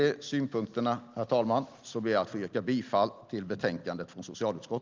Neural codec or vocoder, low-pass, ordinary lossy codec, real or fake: none; 7.2 kHz; Opus, 24 kbps; real